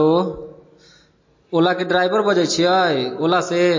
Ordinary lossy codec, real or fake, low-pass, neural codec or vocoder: MP3, 32 kbps; real; 7.2 kHz; none